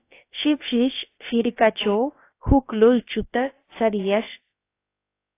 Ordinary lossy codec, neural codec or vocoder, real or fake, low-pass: AAC, 24 kbps; codec, 16 kHz, about 1 kbps, DyCAST, with the encoder's durations; fake; 3.6 kHz